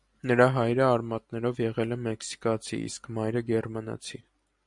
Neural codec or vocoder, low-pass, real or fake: none; 10.8 kHz; real